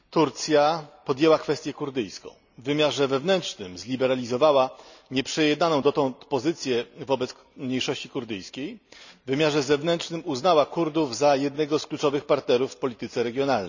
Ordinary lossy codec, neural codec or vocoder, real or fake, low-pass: none; none; real; 7.2 kHz